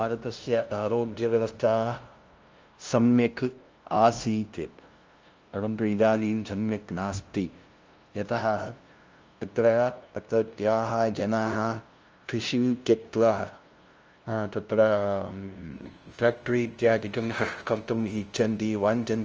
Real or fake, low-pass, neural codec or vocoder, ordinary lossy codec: fake; 7.2 kHz; codec, 16 kHz, 0.5 kbps, FunCodec, trained on LibriTTS, 25 frames a second; Opus, 24 kbps